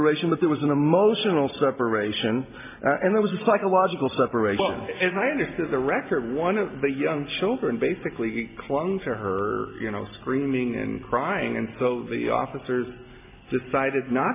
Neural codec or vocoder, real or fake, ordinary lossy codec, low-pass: none; real; MP3, 32 kbps; 3.6 kHz